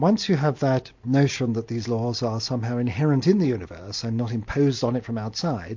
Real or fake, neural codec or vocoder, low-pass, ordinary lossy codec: real; none; 7.2 kHz; MP3, 48 kbps